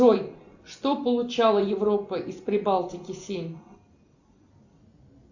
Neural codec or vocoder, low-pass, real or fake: none; 7.2 kHz; real